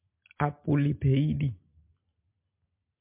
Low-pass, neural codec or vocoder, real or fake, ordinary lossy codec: 3.6 kHz; none; real; MP3, 32 kbps